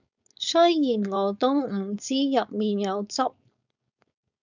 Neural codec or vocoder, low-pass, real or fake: codec, 16 kHz, 4.8 kbps, FACodec; 7.2 kHz; fake